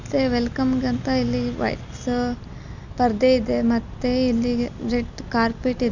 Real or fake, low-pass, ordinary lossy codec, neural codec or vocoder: real; 7.2 kHz; none; none